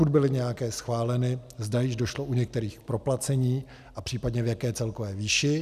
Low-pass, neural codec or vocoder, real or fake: 14.4 kHz; none; real